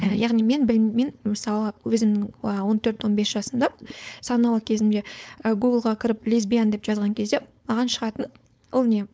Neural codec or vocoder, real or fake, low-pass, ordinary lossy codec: codec, 16 kHz, 4.8 kbps, FACodec; fake; none; none